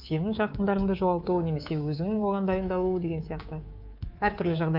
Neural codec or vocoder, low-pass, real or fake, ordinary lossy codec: codec, 24 kHz, 3.1 kbps, DualCodec; 5.4 kHz; fake; Opus, 24 kbps